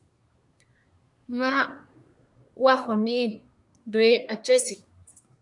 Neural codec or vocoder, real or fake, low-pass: codec, 24 kHz, 1 kbps, SNAC; fake; 10.8 kHz